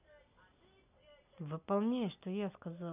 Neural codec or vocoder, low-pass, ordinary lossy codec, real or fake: none; 3.6 kHz; none; real